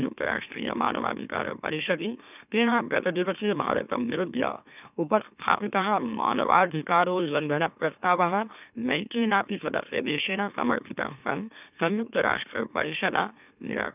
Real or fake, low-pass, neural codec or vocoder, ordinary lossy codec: fake; 3.6 kHz; autoencoder, 44.1 kHz, a latent of 192 numbers a frame, MeloTTS; none